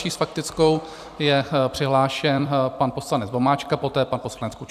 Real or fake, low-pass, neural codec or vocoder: fake; 14.4 kHz; vocoder, 44.1 kHz, 128 mel bands every 256 samples, BigVGAN v2